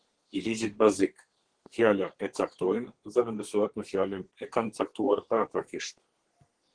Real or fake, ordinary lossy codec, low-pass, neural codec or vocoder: fake; Opus, 16 kbps; 9.9 kHz; codec, 32 kHz, 1.9 kbps, SNAC